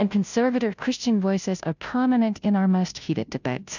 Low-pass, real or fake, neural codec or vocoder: 7.2 kHz; fake; codec, 16 kHz, 0.5 kbps, FunCodec, trained on Chinese and English, 25 frames a second